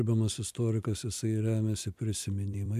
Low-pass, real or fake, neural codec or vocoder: 14.4 kHz; fake; vocoder, 44.1 kHz, 128 mel bands every 256 samples, BigVGAN v2